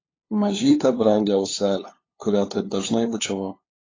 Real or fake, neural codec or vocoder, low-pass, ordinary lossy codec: fake; codec, 16 kHz, 2 kbps, FunCodec, trained on LibriTTS, 25 frames a second; 7.2 kHz; AAC, 32 kbps